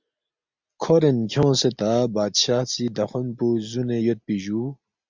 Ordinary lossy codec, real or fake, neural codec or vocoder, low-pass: MP3, 64 kbps; real; none; 7.2 kHz